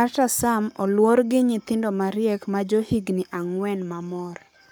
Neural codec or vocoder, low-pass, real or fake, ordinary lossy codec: codec, 44.1 kHz, 7.8 kbps, Pupu-Codec; none; fake; none